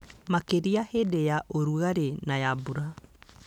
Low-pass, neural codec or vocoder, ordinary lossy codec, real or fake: 19.8 kHz; none; none; real